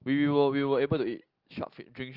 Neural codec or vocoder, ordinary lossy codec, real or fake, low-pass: none; Opus, 24 kbps; real; 5.4 kHz